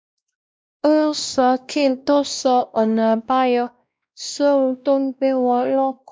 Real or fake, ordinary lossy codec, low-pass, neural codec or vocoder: fake; none; none; codec, 16 kHz, 1 kbps, X-Codec, WavLM features, trained on Multilingual LibriSpeech